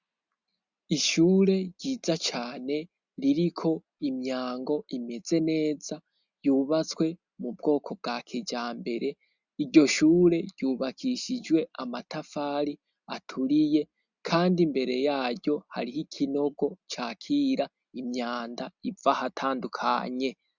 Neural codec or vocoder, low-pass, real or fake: none; 7.2 kHz; real